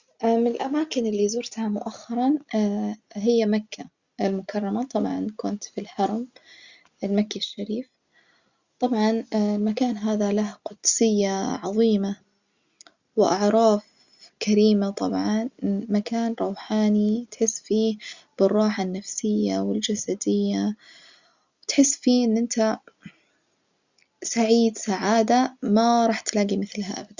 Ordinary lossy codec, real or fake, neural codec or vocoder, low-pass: Opus, 64 kbps; real; none; 7.2 kHz